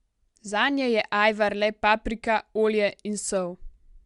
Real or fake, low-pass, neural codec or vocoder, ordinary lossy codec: real; 10.8 kHz; none; Opus, 64 kbps